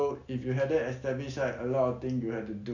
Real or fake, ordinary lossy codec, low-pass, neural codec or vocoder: real; none; 7.2 kHz; none